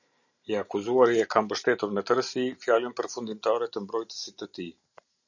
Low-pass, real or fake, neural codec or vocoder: 7.2 kHz; real; none